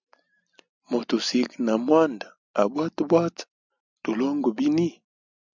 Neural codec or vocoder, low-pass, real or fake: none; 7.2 kHz; real